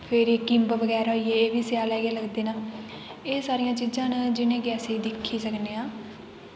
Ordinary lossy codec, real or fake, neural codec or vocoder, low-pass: none; real; none; none